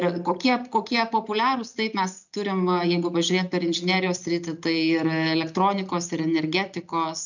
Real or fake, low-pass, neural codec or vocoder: real; 7.2 kHz; none